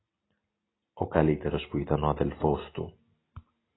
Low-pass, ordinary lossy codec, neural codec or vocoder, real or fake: 7.2 kHz; AAC, 16 kbps; none; real